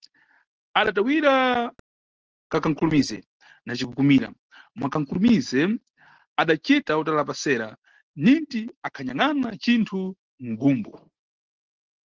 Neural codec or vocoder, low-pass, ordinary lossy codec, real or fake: none; 7.2 kHz; Opus, 16 kbps; real